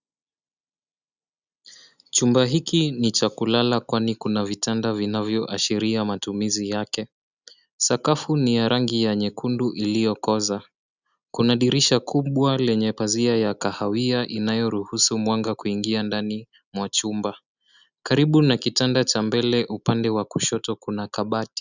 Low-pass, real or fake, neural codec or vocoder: 7.2 kHz; real; none